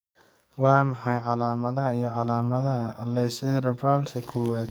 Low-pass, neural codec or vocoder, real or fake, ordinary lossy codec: none; codec, 44.1 kHz, 2.6 kbps, SNAC; fake; none